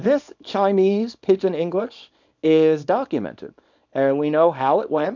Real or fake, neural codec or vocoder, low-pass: fake; codec, 24 kHz, 0.9 kbps, WavTokenizer, small release; 7.2 kHz